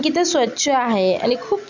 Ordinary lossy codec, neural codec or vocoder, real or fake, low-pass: none; none; real; 7.2 kHz